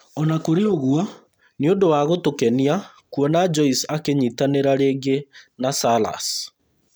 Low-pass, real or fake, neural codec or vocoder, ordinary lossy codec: none; real; none; none